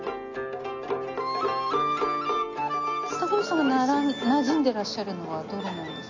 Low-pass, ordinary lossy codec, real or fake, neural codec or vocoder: 7.2 kHz; AAC, 48 kbps; real; none